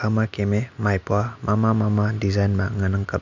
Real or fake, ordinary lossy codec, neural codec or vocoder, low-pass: real; none; none; 7.2 kHz